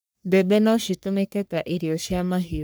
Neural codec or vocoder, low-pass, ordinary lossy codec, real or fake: codec, 44.1 kHz, 3.4 kbps, Pupu-Codec; none; none; fake